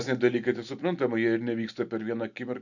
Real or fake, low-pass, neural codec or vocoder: real; 7.2 kHz; none